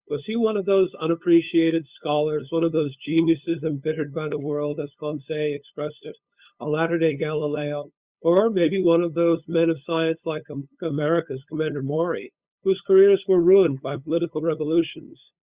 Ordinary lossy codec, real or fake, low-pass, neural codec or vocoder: Opus, 64 kbps; fake; 3.6 kHz; codec, 16 kHz, 8 kbps, FunCodec, trained on LibriTTS, 25 frames a second